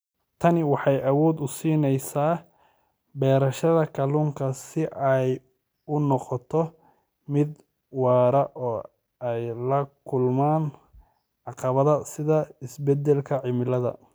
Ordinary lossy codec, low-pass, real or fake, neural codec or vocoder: none; none; real; none